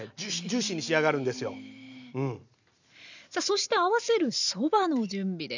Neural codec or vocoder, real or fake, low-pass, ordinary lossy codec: none; real; 7.2 kHz; none